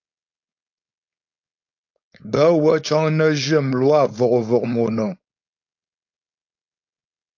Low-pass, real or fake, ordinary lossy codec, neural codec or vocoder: 7.2 kHz; fake; AAC, 48 kbps; codec, 16 kHz, 4.8 kbps, FACodec